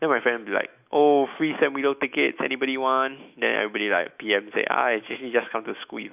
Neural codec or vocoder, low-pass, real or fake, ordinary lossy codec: autoencoder, 48 kHz, 128 numbers a frame, DAC-VAE, trained on Japanese speech; 3.6 kHz; fake; none